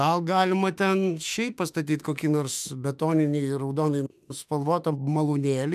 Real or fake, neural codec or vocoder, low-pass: fake; autoencoder, 48 kHz, 32 numbers a frame, DAC-VAE, trained on Japanese speech; 14.4 kHz